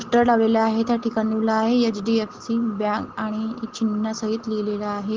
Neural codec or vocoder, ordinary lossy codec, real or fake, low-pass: none; Opus, 16 kbps; real; 7.2 kHz